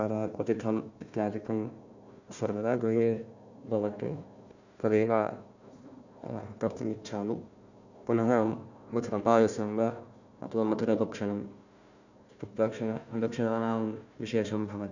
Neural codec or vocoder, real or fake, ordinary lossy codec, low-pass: codec, 16 kHz, 1 kbps, FunCodec, trained on Chinese and English, 50 frames a second; fake; none; 7.2 kHz